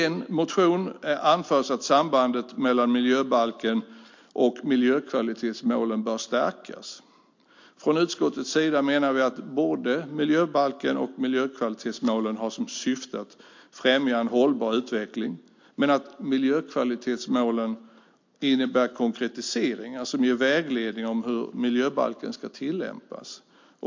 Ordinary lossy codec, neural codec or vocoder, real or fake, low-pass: MP3, 48 kbps; none; real; 7.2 kHz